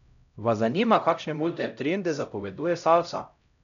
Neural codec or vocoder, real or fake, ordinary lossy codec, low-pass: codec, 16 kHz, 0.5 kbps, X-Codec, HuBERT features, trained on LibriSpeech; fake; none; 7.2 kHz